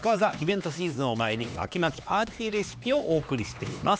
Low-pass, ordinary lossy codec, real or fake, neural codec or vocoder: none; none; fake; codec, 16 kHz, 2 kbps, X-Codec, HuBERT features, trained on LibriSpeech